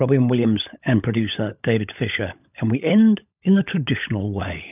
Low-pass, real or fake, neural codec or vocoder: 3.6 kHz; fake; codec, 16 kHz, 16 kbps, FunCodec, trained on LibriTTS, 50 frames a second